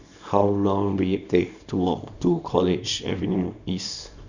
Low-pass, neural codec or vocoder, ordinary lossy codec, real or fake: 7.2 kHz; codec, 24 kHz, 0.9 kbps, WavTokenizer, small release; none; fake